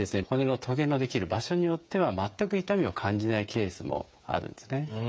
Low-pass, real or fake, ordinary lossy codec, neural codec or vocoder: none; fake; none; codec, 16 kHz, 8 kbps, FreqCodec, smaller model